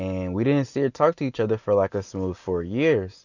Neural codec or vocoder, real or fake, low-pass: none; real; 7.2 kHz